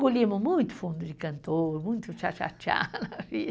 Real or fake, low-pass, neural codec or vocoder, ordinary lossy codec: real; none; none; none